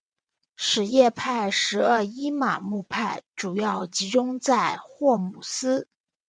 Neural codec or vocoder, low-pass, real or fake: vocoder, 22.05 kHz, 80 mel bands, WaveNeXt; 9.9 kHz; fake